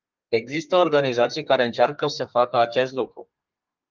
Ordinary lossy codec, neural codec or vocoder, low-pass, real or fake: Opus, 24 kbps; codec, 32 kHz, 1.9 kbps, SNAC; 7.2 kHz; fake